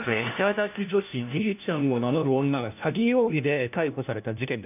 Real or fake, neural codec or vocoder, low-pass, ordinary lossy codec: fake; codec, 16 kHz, 1 kbps, FunCodec, trained on LibriTTS, 50 frames a second; 3.6 kHz; none